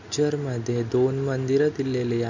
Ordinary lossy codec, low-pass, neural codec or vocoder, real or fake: none; 7.2 kHz; none; real